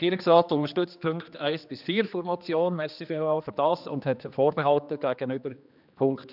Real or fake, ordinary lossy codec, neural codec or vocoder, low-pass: fake; none; codec, 16 kHz, 2 kbps, X-Codec, HuBERT features, trained on general audio; 5.4 kHz